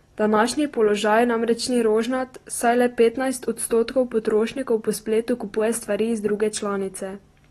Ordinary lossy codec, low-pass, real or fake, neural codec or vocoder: AAC, 32 kbps; 19.8 kHz; real; none